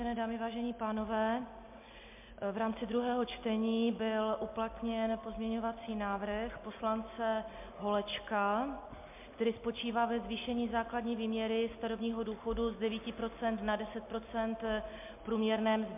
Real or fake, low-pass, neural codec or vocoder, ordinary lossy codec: real; 3.6 kHz; none; MP3, 32 kbps